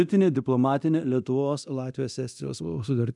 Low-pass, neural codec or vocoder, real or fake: 10.8 kHz; codec, 24 kHz, 0.9 kbps, DualCodec; fake